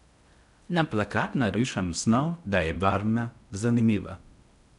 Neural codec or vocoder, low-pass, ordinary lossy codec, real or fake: codec, 16 kHz in and 24 kHz out, 0.6 kbps, FocalCodec, streaming, 2048 codes; 10.8 kHz; none; fake